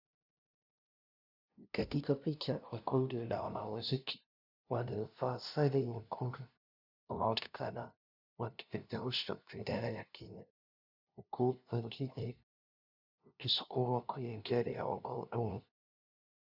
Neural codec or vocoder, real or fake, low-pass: codec, 16 kHz, 0.5 kbps, FunCodec, trained on LibriTTS, 25 frames a second; fake; 5.4 kHz